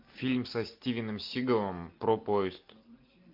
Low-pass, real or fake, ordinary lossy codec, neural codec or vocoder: 5.4 kHz; real; MP3, 48 kbps; none